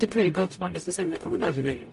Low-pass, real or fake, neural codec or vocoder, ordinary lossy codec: 14.4 kHz; fake; codec, 44.1 kHz, 0.9 kbps, DAC; MP3, 48 kbps